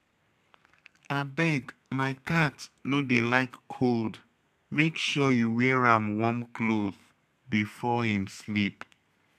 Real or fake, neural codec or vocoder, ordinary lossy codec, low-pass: fake; codec, 32 kHz, 1.9 kbps, SNAC; none; 14.4 kHz